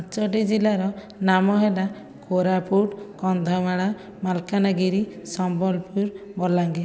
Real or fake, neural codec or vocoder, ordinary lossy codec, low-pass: real; none; none; none